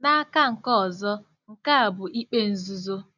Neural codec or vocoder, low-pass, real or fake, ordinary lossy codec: none; 7.2 kHz; real; none